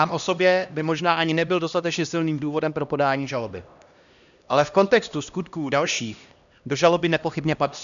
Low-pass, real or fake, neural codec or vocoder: 7.2 kHz; fake; codec, 16 kHz, 1 kbps, X-Codec, HuBERT features, trained on LibriSpeech